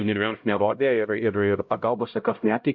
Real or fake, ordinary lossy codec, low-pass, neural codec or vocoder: fake; MP3, 48 kbps; 7.2 kHz; codec, 16 kHz, 0.5 kbps, X-Codec, HuBERT features, trained on LibriSpeech